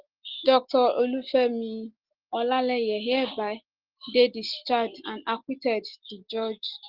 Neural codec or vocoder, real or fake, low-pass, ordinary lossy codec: none; real; 5.4 kHz; Opus, 16 kbps